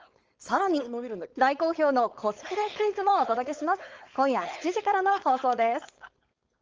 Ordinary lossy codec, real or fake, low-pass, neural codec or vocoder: Opus, 24 kbps; fake; 7.2 kHz; codec, 16 kHz, 4.8 kbps, FACodec